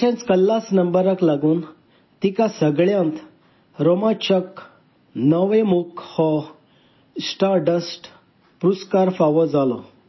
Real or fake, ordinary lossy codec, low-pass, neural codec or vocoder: real; MP3, 24 kbps; 7.2 kHz; none